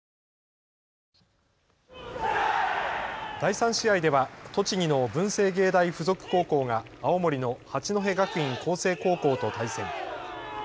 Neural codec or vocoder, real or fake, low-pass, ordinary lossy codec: none; real; none; none